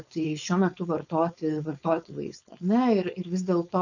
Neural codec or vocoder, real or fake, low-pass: codec, 16 kHz, 4.8 kbps, FACodec; fake; 7.2 kHz